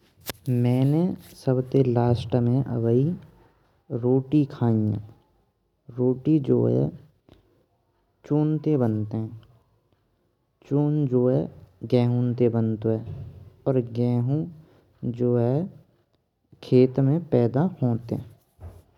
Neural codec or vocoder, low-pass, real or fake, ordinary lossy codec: none; 19.8 kHz; real; none